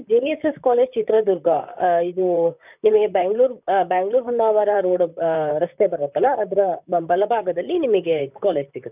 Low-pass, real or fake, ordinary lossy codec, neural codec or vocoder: 3.6 kHz; fake; none; vocoder, 44.1 kHz, 128 mel bands, Pupu-Vocoder